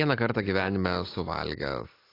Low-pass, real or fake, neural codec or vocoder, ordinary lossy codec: 5.4 kHz; real; none; AAC, 24 kbps